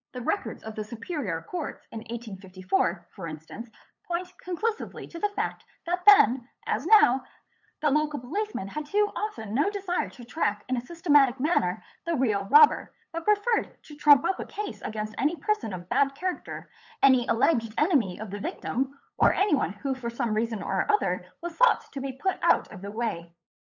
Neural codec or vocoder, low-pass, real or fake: codec, 16 kHz, 8 kbps, FunCodec, trained on LibriTTS, 25 frames a second; 7.2 kHz; fake